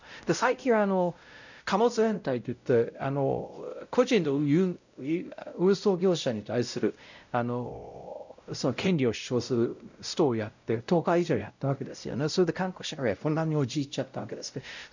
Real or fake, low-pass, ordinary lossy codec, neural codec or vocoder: fake; 7.2 kHz; none; codec, 16 kHz, 0.5 kbps, X-Codec, WavLM features, trained on Multilingual LibriSpeech